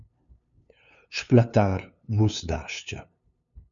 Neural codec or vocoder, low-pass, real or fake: codec, 16 kHz, 2 kbps, FunCodec, trained on LibriTTS, 25 frames a second; 7.2 kHz; fake